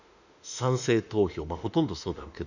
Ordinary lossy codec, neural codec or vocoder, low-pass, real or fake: none; autoencoder, 48 kHz, 32 numbers a frame, DAC-VAE, trained on Japanese speech; 7.2 kHz; fake